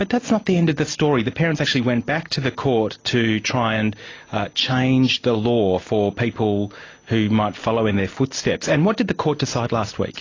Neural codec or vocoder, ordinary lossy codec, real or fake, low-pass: none; AAC, 32 kbps; real; 7.2 kHz